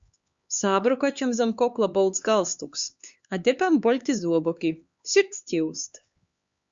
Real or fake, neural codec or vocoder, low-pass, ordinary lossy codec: fake; codec, 16 kHz, 4 kbps, X-Codec, HuBERT features, trained on LibriSpeech; 7.2 kHz; Opus, 64 kbps